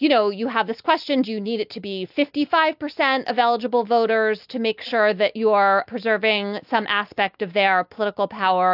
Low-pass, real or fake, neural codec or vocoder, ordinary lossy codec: 5.4 kHz; real; none; AAC, 48 kbps